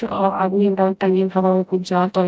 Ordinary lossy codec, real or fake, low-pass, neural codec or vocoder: none; fake; none; codec, 16 kHz, 0.5 kbps, FreqCodec, smaller model